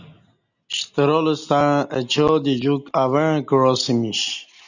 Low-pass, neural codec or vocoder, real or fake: 7.2 kHz; none; real